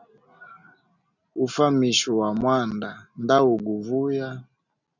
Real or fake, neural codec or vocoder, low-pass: real; none; 7.2 kHz